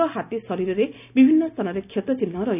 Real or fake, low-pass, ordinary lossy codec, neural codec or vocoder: real; 3.6 kHz; none; none